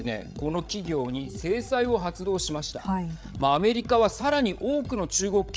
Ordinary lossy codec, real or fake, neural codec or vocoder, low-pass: none; fake; codec, 16 kHz, 8 kbps, FreqCodec, larger model; none